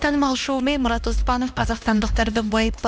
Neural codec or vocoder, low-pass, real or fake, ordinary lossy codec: codec, 16 kHz, 1 kbps, X-Codec, HuBERT features, trained on LibriSpeech; none; fake; none